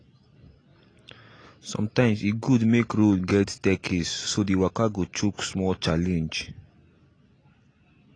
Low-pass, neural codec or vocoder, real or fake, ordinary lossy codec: 9.9 kHz; none; real; AAC, 32 kbps